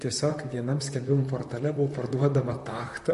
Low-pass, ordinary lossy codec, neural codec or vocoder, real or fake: 14.4 kHz; MP3, 48 kbps; vocoder, 44.1 kHz, 128 mel bands, Pupu-Vocoder; fake